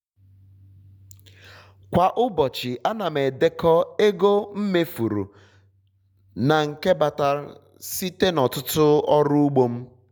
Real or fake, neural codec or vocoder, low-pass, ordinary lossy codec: real; none; 19.8 kHz; none